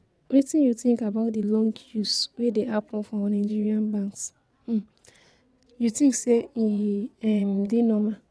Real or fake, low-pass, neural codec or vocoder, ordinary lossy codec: fake; none; vocoder, 22.05 kHz, 80 mel bands, WaveNeXt; none